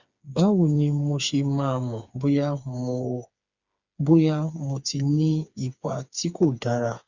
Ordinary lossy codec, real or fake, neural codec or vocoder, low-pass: Opus, 64 kbps; fake; codec, 16 kHz, 4 kbps, FreqCodec, smaller model; 7.2 kHz